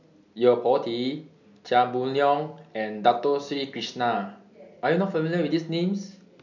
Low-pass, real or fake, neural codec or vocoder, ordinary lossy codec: 7.2 kHz; real; none; none